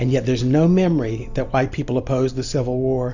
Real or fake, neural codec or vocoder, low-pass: real; none; 7.2 kHz